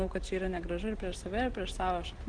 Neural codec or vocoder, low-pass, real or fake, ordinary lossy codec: none; 9.9 kHz; real; Opus, 16 kbps